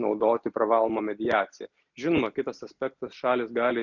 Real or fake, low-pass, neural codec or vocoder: real; 7.2 kHz; none